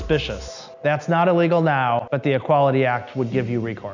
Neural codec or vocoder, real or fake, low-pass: none; real; 7.2 kHz